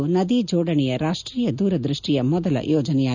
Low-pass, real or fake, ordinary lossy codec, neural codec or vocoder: 7.2 kHz; real; none; none